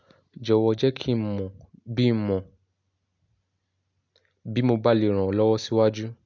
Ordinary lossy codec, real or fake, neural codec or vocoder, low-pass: none; real; none; 7.2 kHz